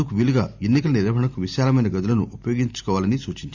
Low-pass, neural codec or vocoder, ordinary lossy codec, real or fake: 7.2 kHz; none; Opus, 64 kbps; real